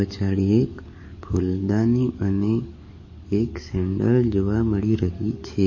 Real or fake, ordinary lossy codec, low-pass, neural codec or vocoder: fake; MP3, 32 kbps; 7.2 kHz; codec, 44.1 kHz, 7.8 kbps, DAC